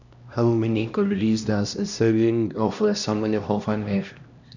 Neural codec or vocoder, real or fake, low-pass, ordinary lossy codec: codec, 16 kHz, 1 kbps, X-Codec, HuBERT features, trained on LibriSpeech; fake; 7.2 kHz; none